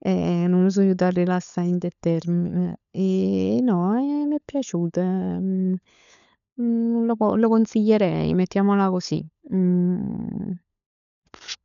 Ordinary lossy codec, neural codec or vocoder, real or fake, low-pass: none; codec, 16 kHz, 16 kbps, FunCodec, trained on LibriTTS, 50 frames a second; fake; 7.2 kHz